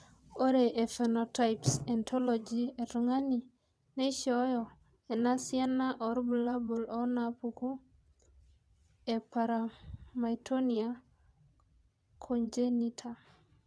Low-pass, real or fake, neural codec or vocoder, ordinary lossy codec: none; fake; vocoder, 22.05 kHz, 80 mel bands, WaveNeXt; none